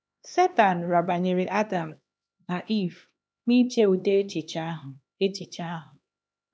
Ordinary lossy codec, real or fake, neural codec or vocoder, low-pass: none; fake; codec, 16 kHz, 2 kbps, X-Codec, HuBERT features, trained on LibriSpeech; none